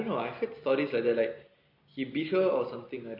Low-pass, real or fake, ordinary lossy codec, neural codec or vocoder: 5.4 kHz; real; MP3, 32 kbps; none